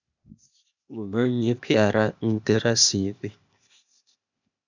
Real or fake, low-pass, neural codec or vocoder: fake; 7.2 kHz; codec, 16 kHz, 0.8 kbps, ZipCodec